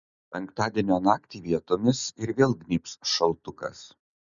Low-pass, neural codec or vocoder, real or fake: 7.2 kHz; none; real